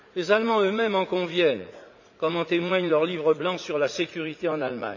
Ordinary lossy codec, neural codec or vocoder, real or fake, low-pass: none; vocoder, 44.1 kHz, 80 mel bands, Vocos; fake; 7.2 kHz